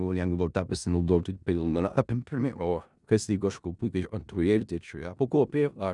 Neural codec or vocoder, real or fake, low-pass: codec, 16 kHz in and 24 kHz out, 0.4 kbps, LongCat-Audio-Codec, four codebook decoder; fake; 10.8 kHz